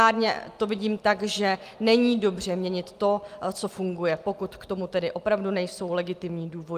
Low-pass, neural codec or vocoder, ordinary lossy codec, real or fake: 14.4 kHz; none; Opus, 32 kbps; real